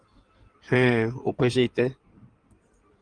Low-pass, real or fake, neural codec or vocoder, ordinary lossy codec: 9.9 kHz; fake; codec, 16 kHz in and 24 kHz out, 2.2 kbps, FireRedTTS-2 codec; Opus, 32 kbps